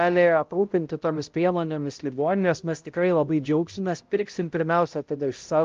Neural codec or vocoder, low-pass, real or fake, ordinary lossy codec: codec, 16 kHz, 0.5 kbps, X-Codec, HuBERT features, trained on balanced general audio; 7.2 kHz; fake; Opus, 32 kbps